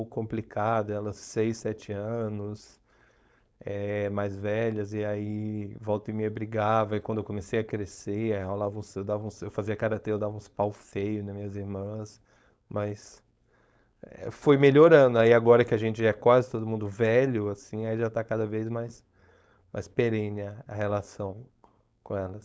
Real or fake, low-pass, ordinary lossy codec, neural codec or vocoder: fake; none; none; codec, 16 kHz, 4.8 kbps, FACodec